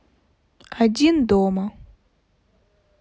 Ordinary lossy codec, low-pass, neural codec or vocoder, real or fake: none; none; none; real